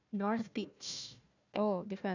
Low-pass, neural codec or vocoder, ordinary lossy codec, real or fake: 7.2 kHz; codec, 16 kHz, 1 kbps, FunCodec, trained on Chinese and English, 50 frames a second; none; fake